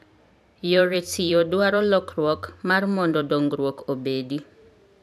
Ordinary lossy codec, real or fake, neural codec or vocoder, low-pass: none; fake; vocoder, 44.1 kHz, 128 mel bands every 512 samples, BigVGAN v2; 14.4 kHz